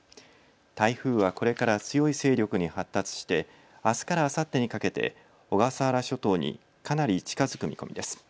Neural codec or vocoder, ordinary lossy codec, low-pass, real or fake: none; none; none; real